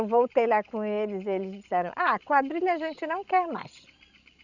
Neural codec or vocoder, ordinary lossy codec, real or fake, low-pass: codec, 16 kHz, 16 kbps, FreqCodec, larger model; none; fake; 7.2 kHz